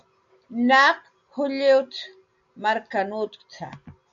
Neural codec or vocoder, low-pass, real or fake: none; 7.2 kHz; real